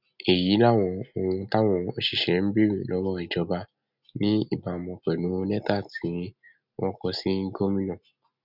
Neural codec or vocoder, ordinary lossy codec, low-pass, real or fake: none; none; 5.4 kHz; real